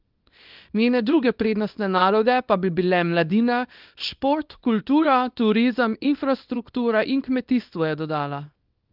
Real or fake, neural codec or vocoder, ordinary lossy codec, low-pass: fake; codec, 24 kHz, 0.9 kbps, WavTokenizer, small release; Opus, 24 kbps; 5.4 kHz